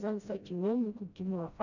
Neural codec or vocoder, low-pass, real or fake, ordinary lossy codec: codec, 16 kHz, 0.5 kbps, FreqCodec, smaller model; 7.2 kHz; fake; none